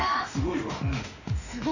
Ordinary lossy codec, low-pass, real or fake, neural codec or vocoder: none; 7.2 kHz; fake; autoencoder, 48 kHz, 32 numbers a frame, DAC-VAE, trained on Japanese speech